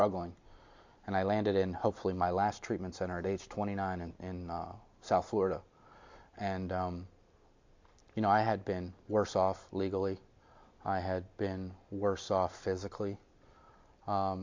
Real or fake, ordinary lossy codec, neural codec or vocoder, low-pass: real; MP3, 48 kbps; none; 7.2 kHz